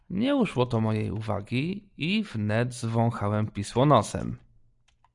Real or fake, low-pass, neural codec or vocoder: real; 10.8 kHz; none